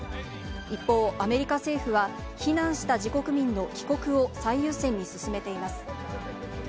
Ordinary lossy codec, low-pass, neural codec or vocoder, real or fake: none; none; none; real